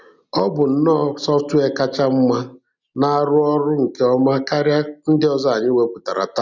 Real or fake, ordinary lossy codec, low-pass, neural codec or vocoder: real; none; 7.2 kHz; none